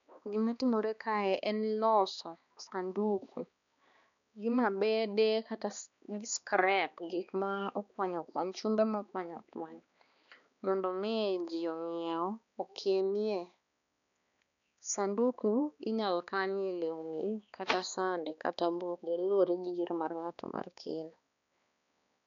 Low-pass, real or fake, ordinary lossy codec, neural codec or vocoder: 7.2 kHz; fake; none; codec, 16 kHz, 2 kbps, X-Codec, HuBERT features, trained on balanced general audio